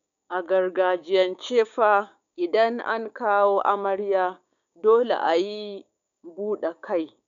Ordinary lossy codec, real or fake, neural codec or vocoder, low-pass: none; fake; codec, 16 kHz, 6 kbps, DAC; 7.2 kHz